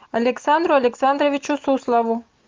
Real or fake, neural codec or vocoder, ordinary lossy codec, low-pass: real; none; Opus, 24 kbps; 7.2 kHz